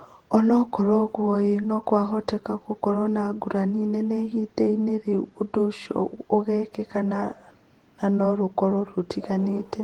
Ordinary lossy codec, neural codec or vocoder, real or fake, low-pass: Opus, 16 kbps; vocoder, 48 kHz, 128 mel bands, Vocos; fake; 19.8 kHz